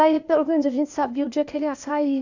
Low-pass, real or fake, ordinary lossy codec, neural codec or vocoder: 7.2 kHz; fake; none; codec, 16 kHz, 0.8 kbps, ZipCodec